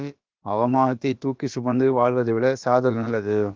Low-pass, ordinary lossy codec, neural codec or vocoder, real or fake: 7.2 kHz; Opus, 32 kbps; codec, 16 kHz, about 1 kbps, DyCAST, with the encoder's durations; fake